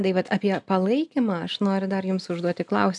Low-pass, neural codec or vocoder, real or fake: 10.8 kHz; none; real